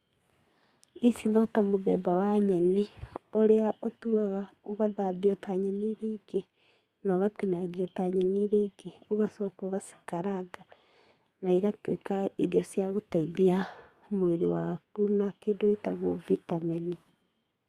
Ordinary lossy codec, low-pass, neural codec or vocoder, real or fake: Opus, 64 kbps; 14.4 kHz; codec, 32 kHz, 1.9 kbps, SNAC; fake